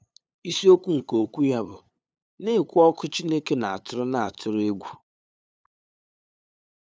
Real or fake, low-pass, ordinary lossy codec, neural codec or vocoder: fake; none; none; codec, 16 kHz, 8 kbps, FunCodec, trained on LibriTTS, 25 frames a second